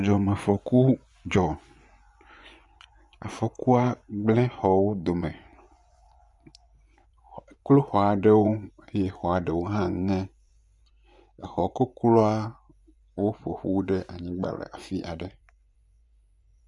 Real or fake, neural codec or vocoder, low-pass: fake; vocoder, 24 kHz, 100 mel bands, Vocos; 10.8 kHz